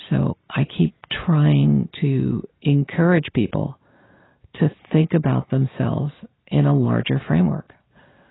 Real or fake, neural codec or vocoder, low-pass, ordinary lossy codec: real; none; 7.2 kHz; AAC, 16 kbps